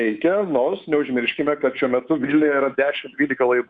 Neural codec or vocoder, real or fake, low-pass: codec, 24 kHz, 3.1 kbps, DualCodec; fake; 9.9 kHz